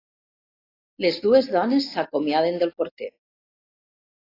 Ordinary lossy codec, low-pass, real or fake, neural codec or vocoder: AAC, 32 kbps; 5.4 kHz; real; none